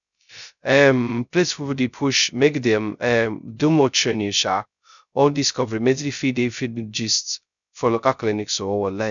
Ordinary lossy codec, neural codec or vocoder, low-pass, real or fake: none; codec, 16 kHz, 0.2 kbps, FocalCodec; 7.2 kHz; fake